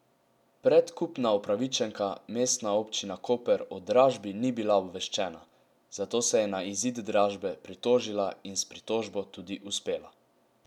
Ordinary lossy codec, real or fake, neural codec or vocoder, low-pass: none; real; none; 19.8 kHz